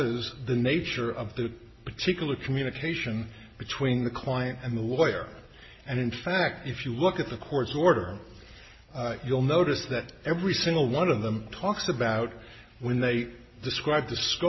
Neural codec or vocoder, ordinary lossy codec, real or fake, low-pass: none; MP3, 24 kbps; real; 7.2 kHz